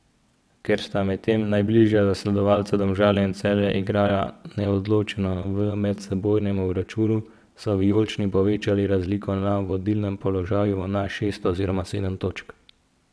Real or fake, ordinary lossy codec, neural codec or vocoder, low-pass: fake; none; vocoder, 22.05 kHz, 80 mel bands, WaveNeXt; none